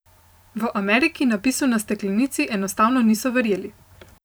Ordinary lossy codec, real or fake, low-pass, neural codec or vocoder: none; fake; none; vocoder, 44.1 kHz, 128 mel bands every 512 samples, BigVGAN v2